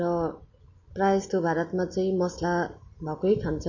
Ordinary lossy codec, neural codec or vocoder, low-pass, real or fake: MP3, 32 kbps; none; 7.2 kHz; real